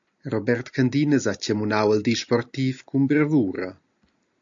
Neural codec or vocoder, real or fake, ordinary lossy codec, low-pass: none; real; AAC, 64 kbps; 7.2 kHz